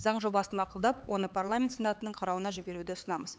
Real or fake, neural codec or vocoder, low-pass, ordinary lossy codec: fake; codec, 16 kHz, 4 kbps, X-Codec, HuBERT features, trained on LibriSpeech; none; none